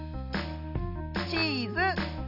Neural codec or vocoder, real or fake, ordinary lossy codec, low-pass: none; real; none; 5.4 kHz